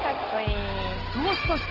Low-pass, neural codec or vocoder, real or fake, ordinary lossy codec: 5.4 kHz; none; real; Opus, 16 kbps